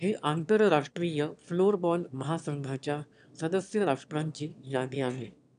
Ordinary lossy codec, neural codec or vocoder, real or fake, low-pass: none; autoencoder, 22.05 kHz, a latent of 192 numbers a frame, VITS, trained on one speaker; fake; 9.9 kHz